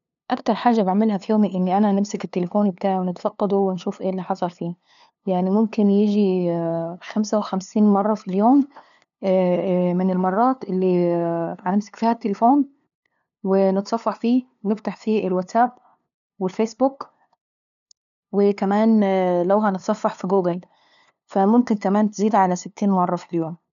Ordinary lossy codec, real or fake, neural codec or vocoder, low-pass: none; fake; codec, 16 kHz, 2 kbps, FunCodec, trained on LibriTTS, 25 frames a second; 7.2 kHz